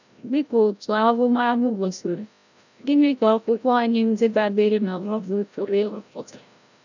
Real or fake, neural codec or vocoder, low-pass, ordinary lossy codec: fake; codec, 16 kHz, 0.5 kbps, FreqCodec, larger model; 7.2 kHz; none